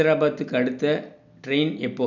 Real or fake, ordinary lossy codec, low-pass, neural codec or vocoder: real; none; 7.2 kHz; none